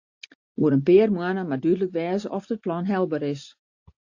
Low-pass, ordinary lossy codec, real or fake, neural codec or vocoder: 7.2 kHz; AAC, 48 kbps; real; none